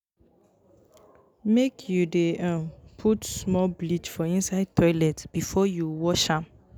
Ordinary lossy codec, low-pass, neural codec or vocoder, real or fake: none; none; none; real